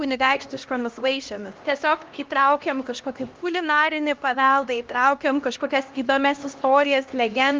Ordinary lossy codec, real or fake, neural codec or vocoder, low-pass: Opus, 32 kbps; fake; codec, 16 kHz, 1 kbps, X-Codec, HuBERT features, trained on LibriSpeech; 7.2 kHz